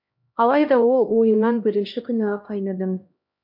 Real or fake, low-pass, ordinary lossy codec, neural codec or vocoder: fake; 5.4 kHz; MP3, 48 kbps; codec, 16 kHz, 1 kbps, X-Codec, HuBERT features, trained on LibriSpeech